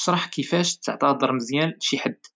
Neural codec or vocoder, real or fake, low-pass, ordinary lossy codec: none; real; none; none